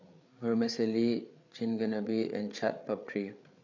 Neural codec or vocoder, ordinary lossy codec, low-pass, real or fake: codec, 16 kHz, 8 kbps, FreqCodec, larger model; AAC, 48 kbps; 7.2 kHz; fake